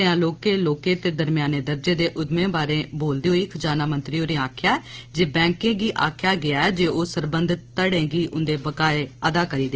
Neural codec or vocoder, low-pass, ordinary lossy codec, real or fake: none; 7.2 kHz; Opus, 16 kbps; real